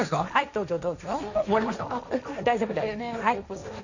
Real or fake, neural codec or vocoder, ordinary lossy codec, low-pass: fake; codec, 16 kHz, 1.1 kbps, Voila-Tokenizer; none; 7.2 kHz